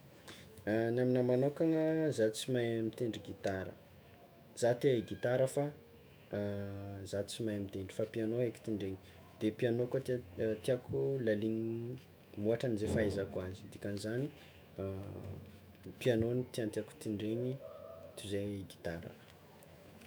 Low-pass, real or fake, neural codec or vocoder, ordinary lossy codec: none; fake; autoencoder, 48 kHz, 128 numbers a frame, DAC-VAE, trained on Japanese speech; none